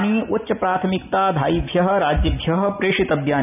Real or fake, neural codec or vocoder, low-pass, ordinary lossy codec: real; none; 3.6 kHz; none